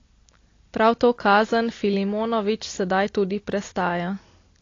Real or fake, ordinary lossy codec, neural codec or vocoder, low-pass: real; AAC, 32 kbps; none; 7.2 kHz